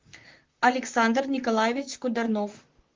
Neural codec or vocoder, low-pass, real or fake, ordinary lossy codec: vocoder, 44.1 kHz, 128 mel bands, Pupu-Vocoder; 7.2 kHz; fake; Opus, 32 kbps